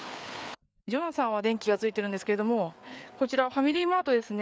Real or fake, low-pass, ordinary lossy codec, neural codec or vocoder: fake; none; none; codec, 16 kHz, 4 kbps, FreqCodec, larger model